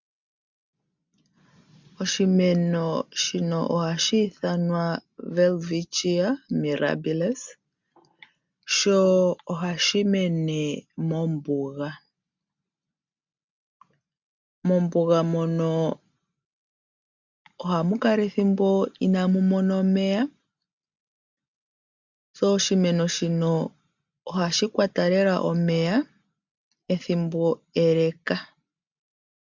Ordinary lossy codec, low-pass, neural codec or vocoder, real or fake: MP3, 64 kbps; 7.2 kHz; none; real